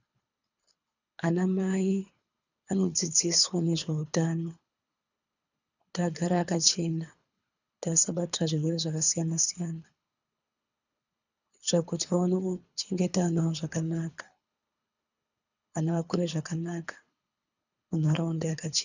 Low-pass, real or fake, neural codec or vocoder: 7.2 kHz; fake; codec, 24 kHz, 3 kbps, HILCodec